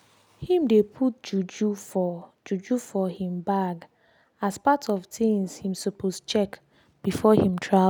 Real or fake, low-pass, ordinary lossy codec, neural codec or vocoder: real; 19.8 kHz; none; none